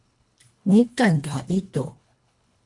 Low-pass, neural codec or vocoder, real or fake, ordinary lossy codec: 10.8 kHz; codec, 24 kHz, 1.5 kbps, HILCodec; fake; MP3, 64 kbps